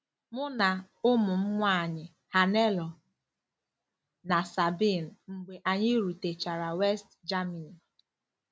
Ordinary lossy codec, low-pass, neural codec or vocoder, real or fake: none; none; none; real